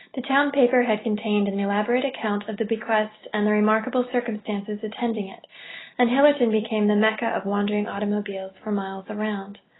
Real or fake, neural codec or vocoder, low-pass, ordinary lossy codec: real; none; 7.2 kHz; AAC, 16 kbps